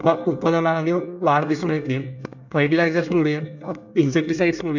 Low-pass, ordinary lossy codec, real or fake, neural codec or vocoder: 7.2 kHz; none; fake; codec, 24 kHz, 1 kbps, SNAC